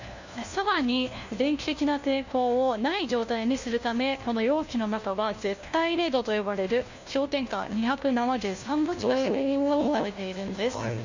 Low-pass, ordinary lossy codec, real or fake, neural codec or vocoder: 7.2 kHz; Opus, 64 kbps; fake; codec, 16 kHz, 1 kbps, FunCodec, trained on LibriTTS, 50 frames a second